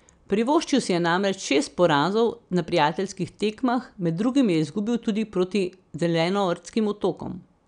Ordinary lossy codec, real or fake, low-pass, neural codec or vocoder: none; real; 9.9 kHz; none